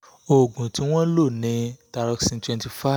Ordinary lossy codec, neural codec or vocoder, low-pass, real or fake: none; none; none; real